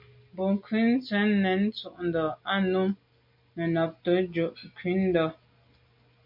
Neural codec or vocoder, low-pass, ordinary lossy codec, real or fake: none; 5.4 kHz; MP3, 48 kbps; real